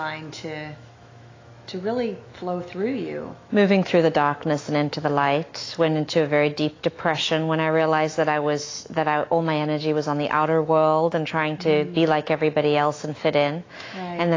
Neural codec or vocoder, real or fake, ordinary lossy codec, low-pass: autoencoder, 48 kHz, 128 numbers a frame, DAC-VAE, trained on Japanese speech; fake; AAC, 32 kbps; 7.2 kHz